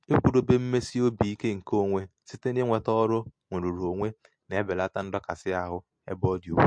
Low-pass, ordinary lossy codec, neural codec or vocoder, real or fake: 9.9 kHz; MP3, 48 kbps; none; real